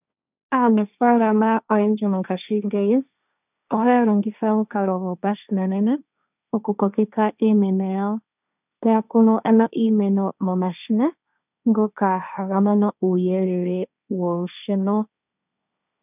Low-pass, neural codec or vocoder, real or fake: 3.6 kHz; codec, 16 kHz, 1.1 kbps, Voila-Tokenizer; fake